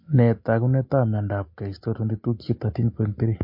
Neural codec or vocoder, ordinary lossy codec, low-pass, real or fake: none; MP3, 32 kbps; 5.4 kHz; real